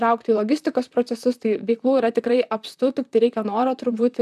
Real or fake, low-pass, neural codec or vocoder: fake; 14.4 kHz; vocoder, 44.1 kHz, 128 mel bands, Pupu-Vocoder